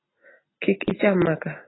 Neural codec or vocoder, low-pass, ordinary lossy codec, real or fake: none; 7.2 kHz; AAC, 16 kbps; real